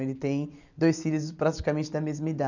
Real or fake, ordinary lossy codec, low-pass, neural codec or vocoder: real; none; 7.2 kHz; none